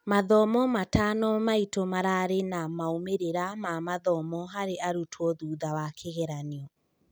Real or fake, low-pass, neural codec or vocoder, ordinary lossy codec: real; none; none; none